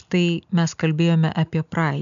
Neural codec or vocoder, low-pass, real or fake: none; 7.2 kHz; real